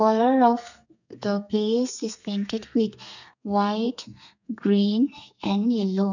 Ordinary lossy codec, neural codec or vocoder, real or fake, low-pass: none; codec, 32 kHz, 1.9 kbps, SNAC; fake; 7.2 kHz